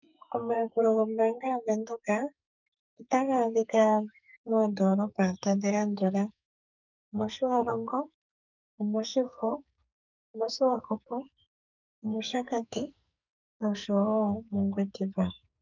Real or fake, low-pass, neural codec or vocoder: fake; 7.2 kHz; codec, 44.1 kHz, 2.6 kbps, SNAC